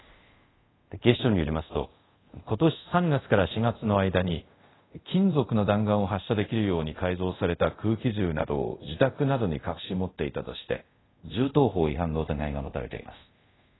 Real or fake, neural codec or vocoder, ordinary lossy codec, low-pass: fake; codec, 24 kHz, 0.5 kbps, DualCodec; AAC, 16 kbps; 7.2 kHz